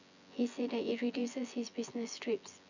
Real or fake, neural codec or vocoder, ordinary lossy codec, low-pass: fake; vocoder, 24 kHz, 100 mel bands, Vocos; none; 7.2 kHz